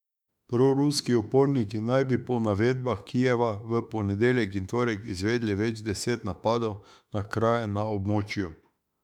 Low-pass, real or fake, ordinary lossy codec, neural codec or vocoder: 19.8 kHz; fake; none; autoencoder, 48 kHz, 32 numbers a frame, DAC-VAE, trained on Japanese speech